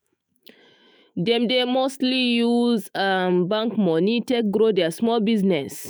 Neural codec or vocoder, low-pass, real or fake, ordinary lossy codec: autoencoder, 48 kHz, 128 numbers a frame, DAC-VAE, trained on Japanese speech; none; fake; none